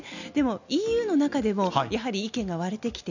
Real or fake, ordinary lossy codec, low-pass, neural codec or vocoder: real; none; 7.2 kHz; none